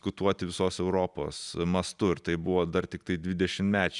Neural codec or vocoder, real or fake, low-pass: none; real; 10.8 kHz